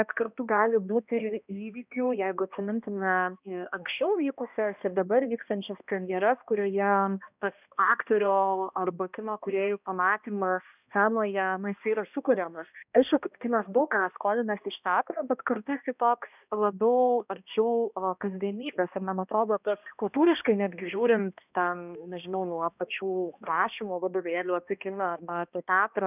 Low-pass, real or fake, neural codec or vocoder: 3.6 kHz; fake; codec, 16 kHz, 1 kbps, X-Codec, HuBERT features, trained on balanced general audio